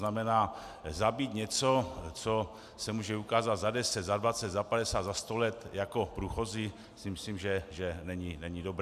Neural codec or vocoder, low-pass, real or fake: none; 14.4 kHz; real